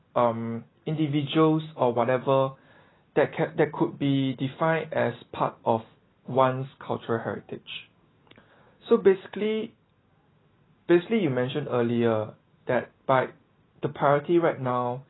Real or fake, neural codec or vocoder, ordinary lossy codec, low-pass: real; none; AAC, 16 kbps; 7.2 kHz